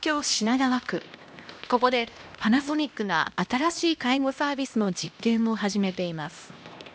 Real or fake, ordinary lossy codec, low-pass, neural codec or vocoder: fake; none; none; codec, 16 kHz, 1 kbps, X-Codec, HuBERT features, trained on LibriSpeech